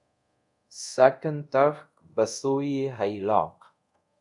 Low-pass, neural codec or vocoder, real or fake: 10.8 kHz; codec, 24 kHz, 0.5 kbps, DualCodec; fake